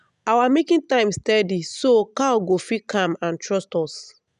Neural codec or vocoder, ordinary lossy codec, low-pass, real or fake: none; none; 10.8 kHz; real